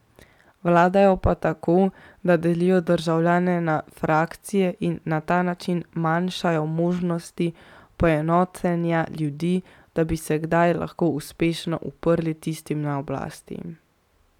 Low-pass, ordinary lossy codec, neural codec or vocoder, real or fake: 19.8 kHz; none; none; real